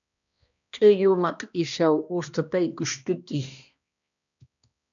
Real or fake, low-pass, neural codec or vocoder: fake; 7.2 kHz; codec, 16 kHz, 1 kbps, X-Codec, HuBERT features, trained on balanced general audio